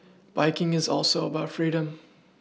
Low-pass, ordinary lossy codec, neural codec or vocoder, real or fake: none; none; none; real